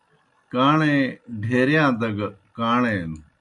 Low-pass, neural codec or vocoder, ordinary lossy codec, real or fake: 10.8 kHz; none; Opus, 64 kbps; real